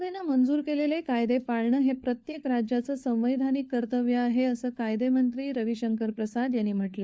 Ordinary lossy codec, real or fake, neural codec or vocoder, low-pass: none; fake; codec, 16 kHz, 4 kbps, FunCodec, trained on LibriTTS, 50 frames a second; none